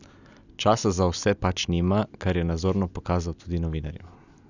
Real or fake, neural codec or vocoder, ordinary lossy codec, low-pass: real; none; none; 7.2 kHz